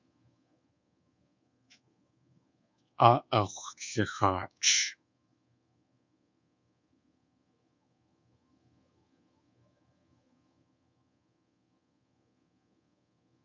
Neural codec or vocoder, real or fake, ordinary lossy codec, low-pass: codec, 24 kHz, 1.2 kbps, DualCodec; fake; MP3, 48 kbps; 7.2 kHz